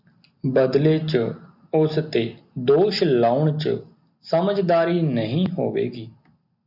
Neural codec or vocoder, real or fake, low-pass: none; real; 5.4 kHz